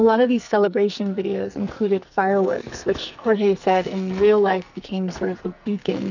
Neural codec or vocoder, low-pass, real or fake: codec, 44.1 kHz, 2.6 kbps, SNAC; 7.2 kHz; fake